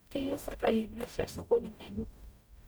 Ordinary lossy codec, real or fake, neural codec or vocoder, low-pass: none; fake; codec, 44.1 kHz, 0.9 kbps, DAC; none